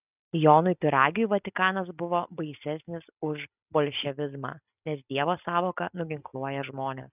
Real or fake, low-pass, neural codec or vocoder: real; 3.6 kHz; none